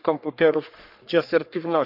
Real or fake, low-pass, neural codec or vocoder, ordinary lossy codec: fake; 5.4 kHz; codec, 44.1 kHz, 3.4 kbps, Pupu-Codec; none